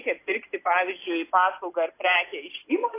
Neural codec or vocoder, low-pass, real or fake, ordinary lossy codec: none; 3.6 kHz; real; AAC, 24 kbps